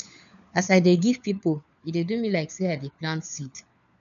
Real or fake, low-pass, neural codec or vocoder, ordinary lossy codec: fake; 7.2 kHz; codec, 16 kHz, 4 kbps, FunCodec, trained on LibriTTS, 50 frames a second; none